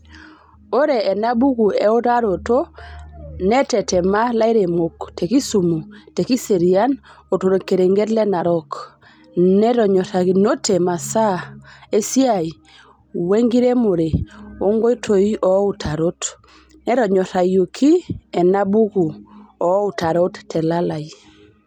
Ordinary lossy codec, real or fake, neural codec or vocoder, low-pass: none; real; none; 19.8 kHz